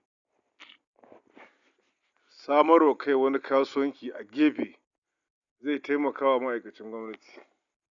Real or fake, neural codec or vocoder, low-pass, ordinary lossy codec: real; none; 7.2 kHz; none